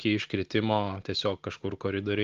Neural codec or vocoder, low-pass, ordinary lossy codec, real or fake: none; 7.2 kHz; Opus, 32 kbps; real